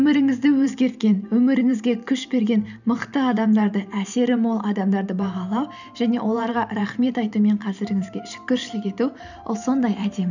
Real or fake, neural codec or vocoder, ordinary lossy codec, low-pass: fake; vocoder, 44.1 kHz, 128 mel bands every 512 samples, BigVGAN v2; none; 7.2 kHz